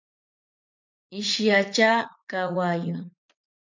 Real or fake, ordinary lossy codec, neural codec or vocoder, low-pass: fake; MP3, 48 kbps; vocoder, 44.1 kHz, 80 mel bands, Vocos; 7.2 kHz